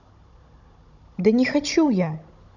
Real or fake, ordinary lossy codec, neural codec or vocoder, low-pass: fake; none; codec, 16 kHz, 16 kbps, FunCodec, trained on Chinese and English, 50 frames a second; 7.2 kHz